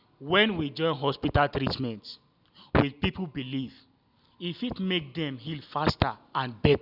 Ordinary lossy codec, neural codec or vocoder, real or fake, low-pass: none; none; real; 5.4 kHz